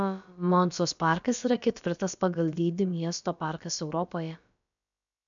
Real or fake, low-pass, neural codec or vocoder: fake; 7.2 kHz; codec, 16 kHz, about 1 kbps, DyCAST, with the encoder's durations